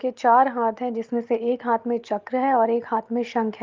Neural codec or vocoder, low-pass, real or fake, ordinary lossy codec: none; 7.2 kHz; real; Opus, 32 kbps